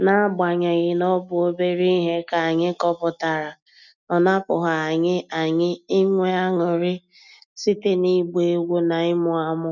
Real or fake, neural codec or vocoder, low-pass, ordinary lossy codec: real; none; 7.2 kHz; none